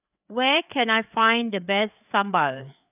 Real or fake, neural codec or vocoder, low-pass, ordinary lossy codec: fake; codec, 16 kHz, 4 kbps, FreqCodec, larger model; 3.6 kHz; none